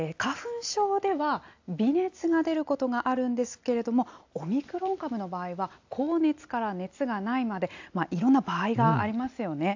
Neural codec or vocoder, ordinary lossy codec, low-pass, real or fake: none; AAC, 48 kbps; 7.2 kHz; real